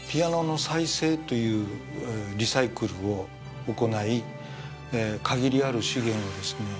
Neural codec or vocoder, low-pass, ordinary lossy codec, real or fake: none; none; none; real